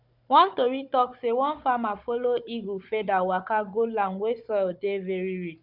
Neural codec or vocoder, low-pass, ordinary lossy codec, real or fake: codec, 16 kHz, 16 kbps, FunCodec, trained on Chinese and English, 50 frames a second; 5.4 kHz; none; fake